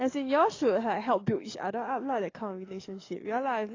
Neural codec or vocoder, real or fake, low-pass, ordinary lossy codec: codec, 44.1 kHz, 7.8 kbps, DAC; fake; 7.2 kHz; AAC, 32 kbps